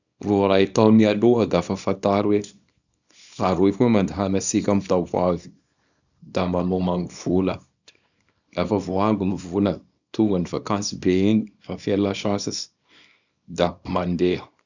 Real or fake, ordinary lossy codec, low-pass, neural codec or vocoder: fake; none; 7.2 kHz; codec, 24 kHz, 0.9 kbps, WavTokenizer, small release